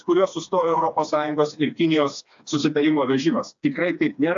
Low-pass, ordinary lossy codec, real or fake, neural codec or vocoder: 7.2 kHz; AAC, 64 kbps; fake; codec, 16 kHz, 2 kbps, FreqCodec, smaller model